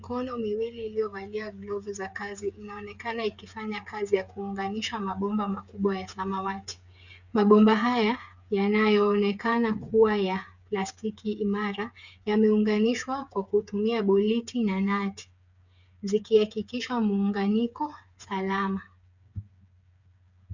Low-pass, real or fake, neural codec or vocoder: 7.2 kHz; fake; codec, 16 kHz, 8 kbps, FreqCodec, smaller model